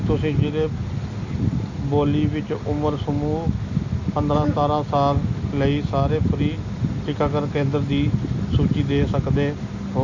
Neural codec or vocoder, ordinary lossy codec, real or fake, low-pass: none; none; real; 7.2 kHz